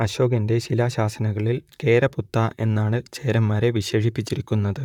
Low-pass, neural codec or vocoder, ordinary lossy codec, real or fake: 19.8 kHz; vocoder, 44.1 kHz, 128 mel bands, Pupu-Vocoder; none; fake